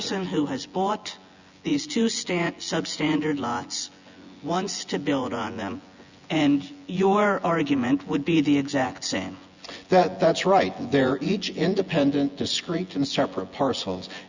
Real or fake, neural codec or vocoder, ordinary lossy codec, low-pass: fake; vocoder, 24 kHz, 100 mel bands, Vocos; Opus, 64 kbps; 7.2 kHz